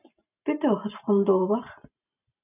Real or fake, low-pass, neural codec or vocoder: fake; 3.6 kHz; vocoder, 44.1 kHz, 128 mel bands every 512 samples, BigVGAN v2